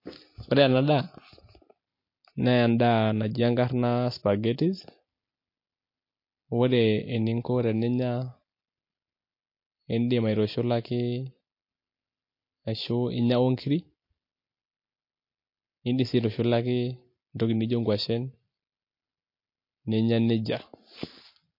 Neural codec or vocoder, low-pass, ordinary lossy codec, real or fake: none; 5.4 kHz; MP3, 32 kbps; real